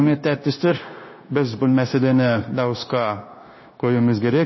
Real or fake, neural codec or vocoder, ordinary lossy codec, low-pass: fake; codec, 16 kHz, 0.9 kbps, LongCat-Audio-Codec; MP3, 24 kbps; 7.2 kHz